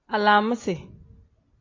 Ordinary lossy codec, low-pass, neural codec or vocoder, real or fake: AAC, 48 kbps; 7.2 kHz; none; real